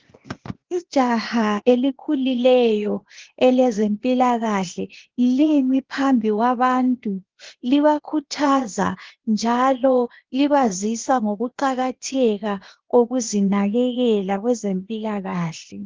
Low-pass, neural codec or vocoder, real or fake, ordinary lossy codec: 7.2 kHz; codec, 16 kHz, 0.8 kbps, ZipCodec; fake; Opus, 16 kbps